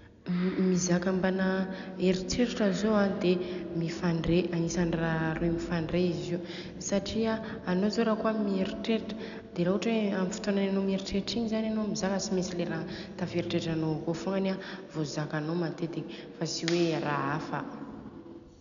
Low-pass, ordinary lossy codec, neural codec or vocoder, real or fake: 7.2 kHz; none; none; real